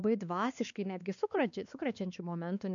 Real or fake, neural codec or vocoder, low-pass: fake; codec, 16 kHz, 2 kbps, X-Codec, WavLM features, trained on Multilingual LibriSpeech; 7.2 kHz